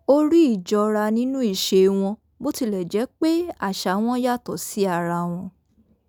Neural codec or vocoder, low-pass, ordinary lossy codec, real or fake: none; none; none; real